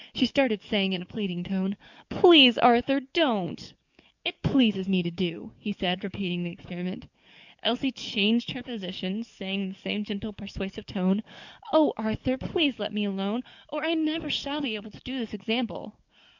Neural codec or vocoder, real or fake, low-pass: codec, 44.1 kHz, 7.8 kbps, DAC; fake; 7.2 kHz